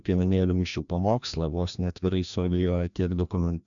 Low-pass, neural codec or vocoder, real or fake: 7.2 kHz; codec, 16 kHz, 1 kbps, FreqCodec, larger model; fake